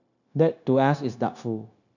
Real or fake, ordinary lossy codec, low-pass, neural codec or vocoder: fake; none; 7.2 kHz; codec, 16 kHz, 0.9 kbps, LongCat-Audio-Codec